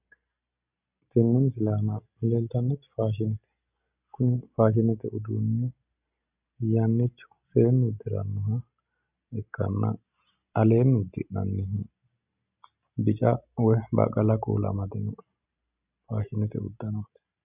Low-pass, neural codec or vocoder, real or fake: 3.6 kHz; none; real